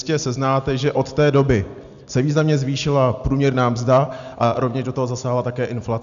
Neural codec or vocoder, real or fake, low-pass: none; real; 7.2 kHz